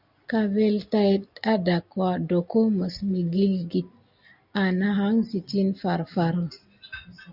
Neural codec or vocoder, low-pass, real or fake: none; 5.4 kHz; real